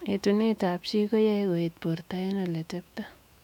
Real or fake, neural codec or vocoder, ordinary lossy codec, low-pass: fake; autoencoder, 48 kHz, 128 numbers a frame, DAC-VAE, trained on Japanese speech; none; 19.8 kHz